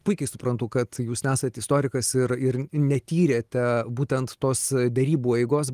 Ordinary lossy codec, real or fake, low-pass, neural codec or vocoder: Opus, 24 kbps; real; 14.4 kHz; none